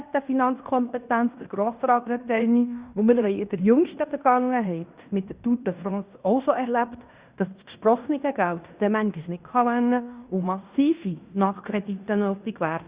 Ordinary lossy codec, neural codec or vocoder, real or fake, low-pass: Opus, 64 kbps; codec, 16 kHz in and 24 kHz out, 0.9 kbps, LongCat-Audio-Codec, fine tuned four codebook decoder; fake; 3.6 kHz